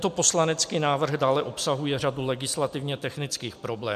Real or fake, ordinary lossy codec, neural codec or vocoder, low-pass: real; MP3, 96 kbps; none; 14.4 kHz